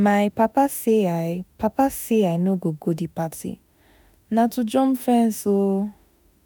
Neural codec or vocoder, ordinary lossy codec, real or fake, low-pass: autoencoder, 48 kHz, 32 numbers a frame, DAC-VAE, trained on Japanese speech; none; fake; none